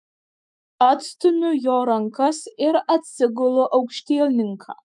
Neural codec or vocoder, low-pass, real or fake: autoencoder, 48 kHz, 128 numbers a frame, DAC-VAE, trained on Japanese speech; 10.8 kHz; fake